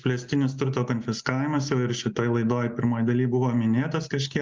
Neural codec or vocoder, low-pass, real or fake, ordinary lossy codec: none; 7.2 kHz; real; Opus, 32 kbps